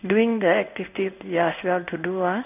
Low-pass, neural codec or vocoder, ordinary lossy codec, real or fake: 3.6 kHz; codec, 16 kHz in and 24 kHz out, 1 kbps, XY-Tokenizer; none; fake